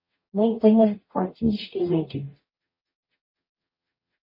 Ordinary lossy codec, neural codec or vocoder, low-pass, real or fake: MP3, 24 kbps; codec, 44.1 kHz, 0.9 kbps, DAC; 5.4 kHz; fake